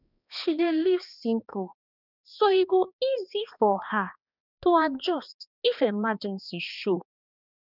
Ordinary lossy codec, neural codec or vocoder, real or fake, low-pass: none; codec, 16 kHz, 2 kbps, X-Codec, HuBERT features, trained on balanced general audio; fake; 5.4 kHz